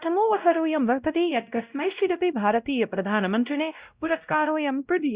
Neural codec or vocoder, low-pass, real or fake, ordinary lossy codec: codec, 16 kHz, 0.5 kbps, X-Codec, WavLM features, trained on Multilingual LibriSpeech; 3.6 kHz; fake; Opus, 64 kbps